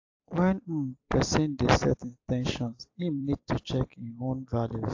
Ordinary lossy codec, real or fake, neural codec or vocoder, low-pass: AAC, 48 kbps; fake; vocoder, 44.1 kHz, 128 mel bands every 256 samples, BigVGAN v2; 7.2 kHz